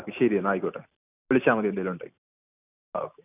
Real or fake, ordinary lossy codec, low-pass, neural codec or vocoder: real; none; 3.6 kHz; none